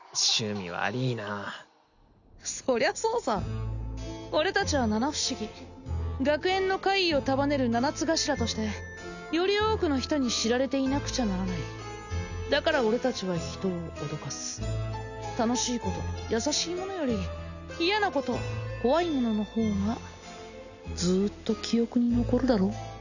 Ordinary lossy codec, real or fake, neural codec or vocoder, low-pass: none; real; none; 7.2 kHz